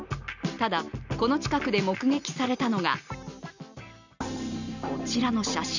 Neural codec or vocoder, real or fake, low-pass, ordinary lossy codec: none; real; 7.2 kHz; none